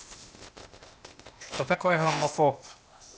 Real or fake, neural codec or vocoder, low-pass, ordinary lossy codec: fake; codec, 16 kHz, 0.8 kbps, ZipCodec; none; none